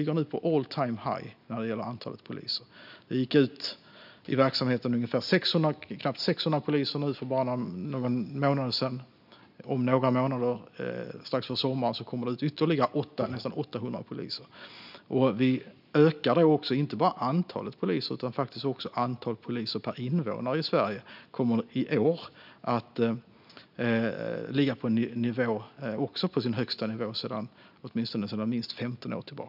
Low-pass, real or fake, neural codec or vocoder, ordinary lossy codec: 5.4 kHz; real; none; none